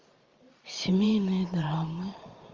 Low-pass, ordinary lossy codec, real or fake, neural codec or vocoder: 7.2 kHz; Opus, 32 kbps; fake; vocoder, 44.1 kHz, 128 mel bands every 512 samples, BigVGAN v2